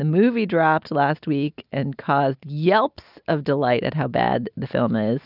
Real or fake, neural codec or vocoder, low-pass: real; none; 5.4 kHz